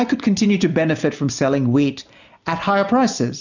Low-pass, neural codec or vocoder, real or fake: 7.2 kHz; none; real